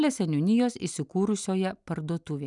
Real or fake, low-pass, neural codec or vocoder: real; 10.8 kHz; none